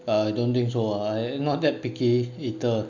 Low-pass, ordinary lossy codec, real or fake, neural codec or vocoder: 7.2 kHz; none; real; none